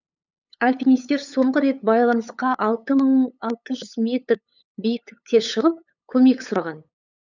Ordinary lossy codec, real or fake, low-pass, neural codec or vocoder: none; fake; 7.2 kHz; codec, 16 kHz, 8 kbps, FunCodec, trained on LibriTTS, 25 frames a second